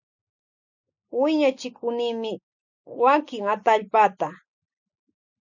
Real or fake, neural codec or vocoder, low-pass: real; none; 7.2 kHz